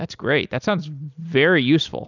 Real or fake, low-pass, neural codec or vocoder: real; 7.2 kHz; none